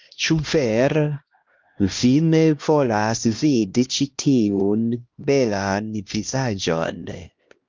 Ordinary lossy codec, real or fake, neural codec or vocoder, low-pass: Opus, 32 kbps; fake; codec, 16 kHz, 1 kbps, X-Codec, HuBERT features, trained on LibriSpeech; 7.2 kHz